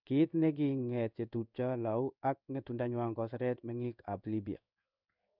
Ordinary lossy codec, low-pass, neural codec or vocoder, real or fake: none; 5.4 kHz; codec, 16 kHz in and 24 kHz out, 1 kbps, XY-Tokenizer; fake